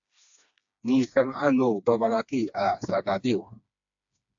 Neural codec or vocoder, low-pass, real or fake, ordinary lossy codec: codec, 16 kHz, 2 kbps, FreqCodec, smaller model; 7.2 kHz; fake; AAC, 64 kbps